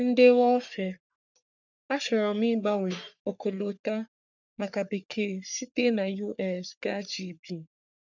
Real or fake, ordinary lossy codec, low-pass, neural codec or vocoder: fake; none; 7.2 kHz; codec, 44.1 kHz, 3.4 kbps, Pupu-Codec